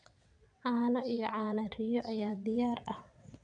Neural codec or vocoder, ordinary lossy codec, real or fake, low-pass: vocoder, 22.05 kHz, 80 mel bands, WaveNeXt; none; fake; 9.9 kHz